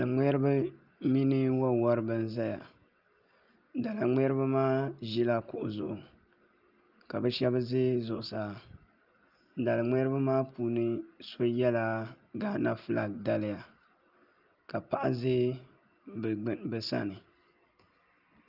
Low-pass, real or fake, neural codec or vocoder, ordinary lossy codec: 5.4 kHz; real; none; Opus, 24 kbps